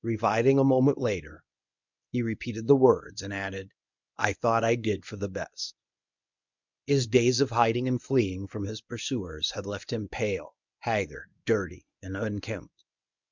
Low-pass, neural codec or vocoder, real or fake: 7.2 kHz; codec, 24 kHz, 0.9 kbps, WavTokenizer, medium speech release version 1; fake